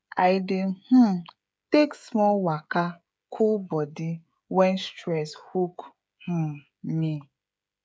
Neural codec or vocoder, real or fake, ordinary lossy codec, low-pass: codec, 16 kHz, 16 kbps, FreqCodec, smaller model; fake; none; none